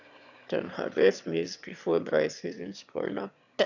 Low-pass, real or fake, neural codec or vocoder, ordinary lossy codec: 7.2 kHz; fake; autoencoder, 22.05 kHz, a latent of 192 numbers a frame, VITS, trained on one speaker; none